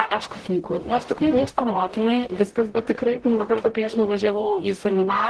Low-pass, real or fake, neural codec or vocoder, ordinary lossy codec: 10.8 kHz; fake; codec, 44.1 kHz, 0.9 kbps, DAC; Opus, 16 kbps